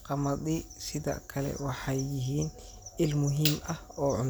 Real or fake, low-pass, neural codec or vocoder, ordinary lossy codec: real; none; none; none